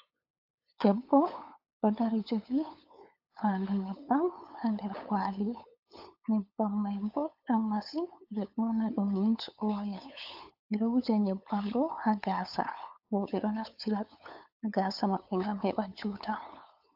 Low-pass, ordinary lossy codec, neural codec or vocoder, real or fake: 5.4 kHz; AAC, 48 kbps; codec, 16 kHz, 8 kbps, FunCodec, trained on LibriTTS, 25 frames a second; fake